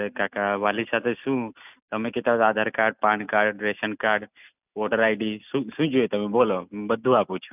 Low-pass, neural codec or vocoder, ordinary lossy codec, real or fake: 3.6 kHz; none; none; real